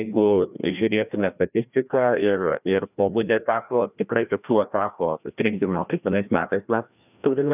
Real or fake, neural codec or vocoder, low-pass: fake; codec, 16 kHz, 1 kbps, FreqCodec, larger model; 3.6 kHz